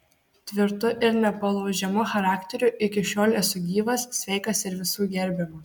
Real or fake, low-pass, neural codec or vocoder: real; 19.8 kHz; none